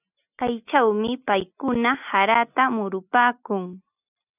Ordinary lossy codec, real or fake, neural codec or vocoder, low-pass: AAC, 32 kbps; real; none; 3.6 kHz